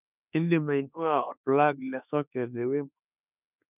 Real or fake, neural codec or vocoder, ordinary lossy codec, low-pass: fake; autoencoder, 48 kHz, 32 numbers a frame, DAC-VAE, trained on Japanese speech; none; 3.6 kHz